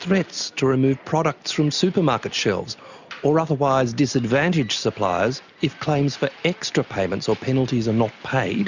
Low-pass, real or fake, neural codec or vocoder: 7.2 kHz; real; none